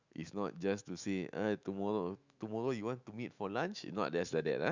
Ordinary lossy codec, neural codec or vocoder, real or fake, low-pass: Opus, 64 kbps; autoencoder, 48 kHz, 128 numbers a frame, DAC-VAE, trained on Japanese speech; fake; 7.2 kHz